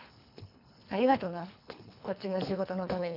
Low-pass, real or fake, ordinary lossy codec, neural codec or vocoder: 5.4 kHz; fake; none; codec, 24 kHz, 3 kbps, HILCodec